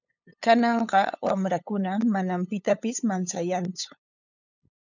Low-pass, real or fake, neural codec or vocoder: 7.2 kHz; fake; codec, 16 kHz, 8 kbps, FunCodec, trained on LibriTTS, 25 frames a second